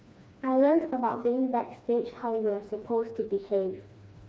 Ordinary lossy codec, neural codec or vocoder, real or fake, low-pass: none; codec, 16 kHz, 2 kbps, FreqCodec, smaller model; fake; none